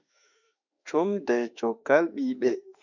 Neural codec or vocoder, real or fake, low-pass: autoencoder, 48 kHz, 32 numbers a frame, DAC-VAE, trained on Japanese speech; fake; 7.2 kHz